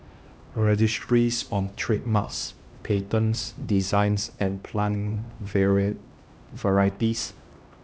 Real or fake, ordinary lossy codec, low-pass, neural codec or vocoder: fake; none; none; codec, 16 kHz, 1 kbps, X-Codec, HuBERT features, trained on LibriSpeech